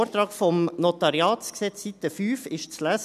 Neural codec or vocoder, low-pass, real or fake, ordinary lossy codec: none; 14.4 kHz; real; none